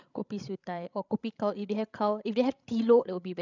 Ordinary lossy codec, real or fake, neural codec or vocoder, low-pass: none; fake; codec, 16 kHz, 16 kbps, FreqCodec, larger model; 7.2 kHz